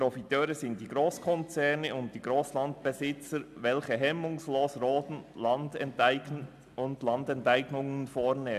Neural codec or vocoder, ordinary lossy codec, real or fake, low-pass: none; AAC, 96 kbps; real; 14.4 kHz